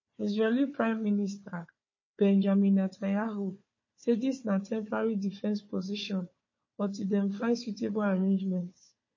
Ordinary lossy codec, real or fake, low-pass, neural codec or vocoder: MP3, 32 kbps; fake; 7.2 kHz; codec, 16 kHz, 4 kbps, FunCodec, trained on Chinese and English, 50 frames a second